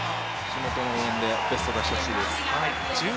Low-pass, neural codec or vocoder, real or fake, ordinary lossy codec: none; none; real; none